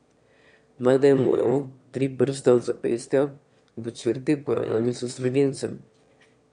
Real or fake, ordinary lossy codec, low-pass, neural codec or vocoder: fake; MP3, 64 kbps; 9.9 kHz; autoencoder, 22.05 kHz, a latent of 192 numbers a frame, VITS, trained on one speaker